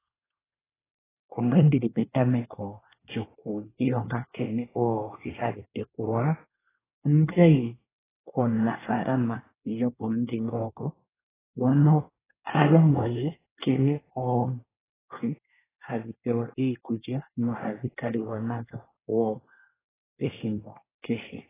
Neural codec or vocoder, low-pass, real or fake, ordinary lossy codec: codec, 24 kHz, 1 kbps, SNAC; 3.6 kHz; fake; AAC, 16 kbps